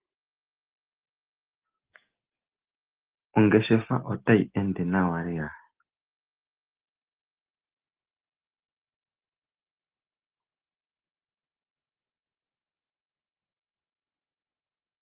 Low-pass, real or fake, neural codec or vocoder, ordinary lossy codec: 3.6 kHz; real; none; Opus, 16 kbps